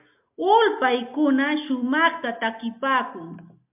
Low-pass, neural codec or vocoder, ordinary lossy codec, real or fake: 3.6 kHz; none; MP3, 32 kbps; real